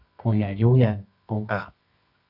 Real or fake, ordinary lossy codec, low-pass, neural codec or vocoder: fake; AAC, 48 kbps; 5.4 kHz; codec, 24 kHz, 0.9 kbps, WavTokenizer, medium music audio release